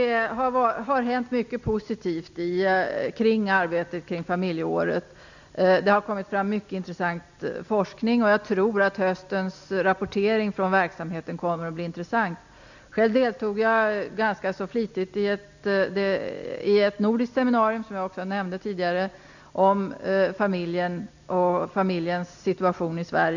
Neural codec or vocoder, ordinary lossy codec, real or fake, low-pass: none; none; real; 7.2 kHz